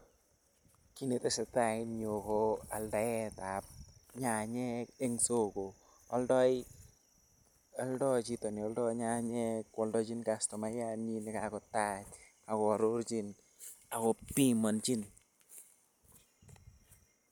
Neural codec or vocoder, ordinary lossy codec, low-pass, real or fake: none; none; none; real